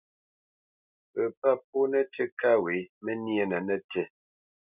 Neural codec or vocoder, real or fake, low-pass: none; real; 3.6 kHz